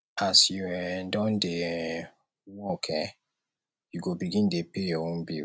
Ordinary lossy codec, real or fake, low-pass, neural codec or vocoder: none; real; none; none